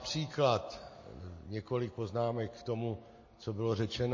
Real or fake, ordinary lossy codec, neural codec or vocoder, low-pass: real; MP3, 32 kbps; none; 7.2 kHz